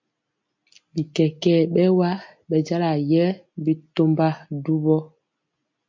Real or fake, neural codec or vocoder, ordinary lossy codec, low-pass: real; none; MP3, 48 kbps; 7.2 kHz